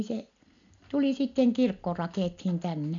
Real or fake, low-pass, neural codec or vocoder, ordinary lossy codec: real; 7.2 kHz; none; none